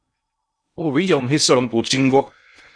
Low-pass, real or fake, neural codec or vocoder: 9.9 kHz; fake; codec, 16 kHz in and 24 kHz out, 0.6 kbps, FocalCodec, streaming, 2048 codes